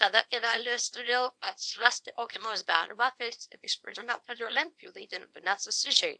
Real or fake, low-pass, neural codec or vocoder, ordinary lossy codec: fake; 9.9 kHz; codec, 24 kHz, 0.9 kbps, WavTokenizer, small release; AAC, 64 kbps